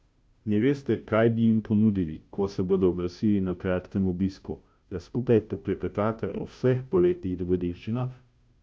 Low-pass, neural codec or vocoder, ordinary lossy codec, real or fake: none; codec, 16 kHz, 0.5 kbps, FunCodec, trained on Chinese and English, 25 frames a second; none; fake